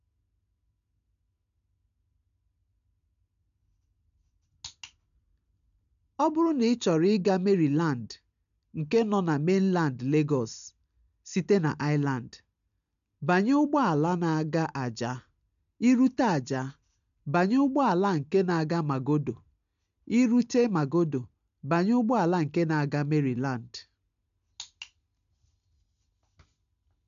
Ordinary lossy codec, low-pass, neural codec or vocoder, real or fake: MP3, 96 kbps; 7.2 kHz; none; real